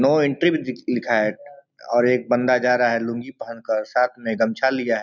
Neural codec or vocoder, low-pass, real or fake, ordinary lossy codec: none; 7.2 kHz; real; none